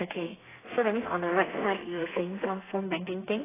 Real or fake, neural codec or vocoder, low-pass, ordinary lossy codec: fake; codec, 32 kHz, 1.9 kbps, SNAC; 3.6 kHz; AAC, 16 kbps